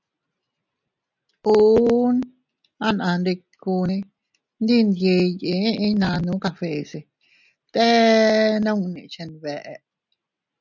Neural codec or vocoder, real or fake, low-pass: none; real; 7.2 kHz